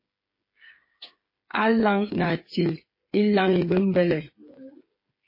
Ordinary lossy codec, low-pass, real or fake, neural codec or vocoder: MP3, 24 kbps; 5.4 kHz; fake; codec, 16 kHz, 8 kbps, FreqCodec, smaller model